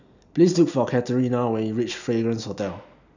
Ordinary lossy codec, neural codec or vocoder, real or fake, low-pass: none; none; real; 7.2 kHz